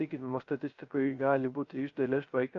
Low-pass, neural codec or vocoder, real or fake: 7.2 kHz; codec, 16 kHz, 0.3 kbps, FocalCodec; fake